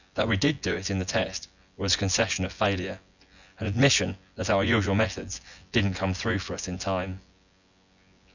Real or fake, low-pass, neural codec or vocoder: fake; 7.2 kHz; vocoder, 24 kHz, 100 mel bands, Vocos